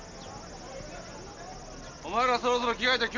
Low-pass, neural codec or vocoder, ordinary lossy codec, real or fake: 7.2 kHz; none; MP3, 48 kbps; real